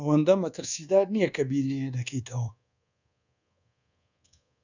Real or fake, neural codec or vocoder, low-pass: fake; codec, 24 kHz, 1.2 kbps, DualCodec; 7.2 kHz